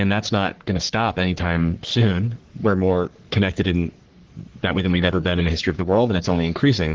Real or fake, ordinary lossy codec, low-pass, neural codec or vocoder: fake; Opus, 16 kbps; 7.2 kHz; codec, 44.1 kHz, 3.4 kbps, Pupu-Codec